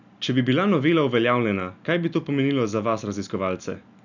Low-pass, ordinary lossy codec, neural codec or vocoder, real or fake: 7.2 kHz; none; none; real